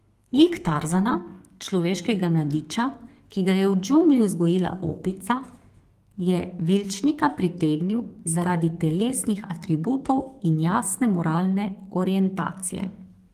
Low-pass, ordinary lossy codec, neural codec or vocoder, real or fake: 14.4 kHz; Opus, 24 kbps; codec, 32 kHz, 1.9 kbps, SNAC; fake